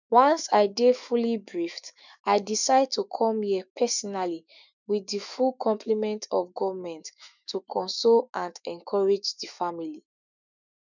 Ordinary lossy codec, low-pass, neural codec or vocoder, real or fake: none; 7.2 kHz; autoencoder, 48 kHz, 128 numbers a frame, DAC-VAE, trained on Japanese speech; fake